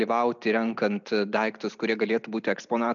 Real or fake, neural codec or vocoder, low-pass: real; none; 7.2 kHz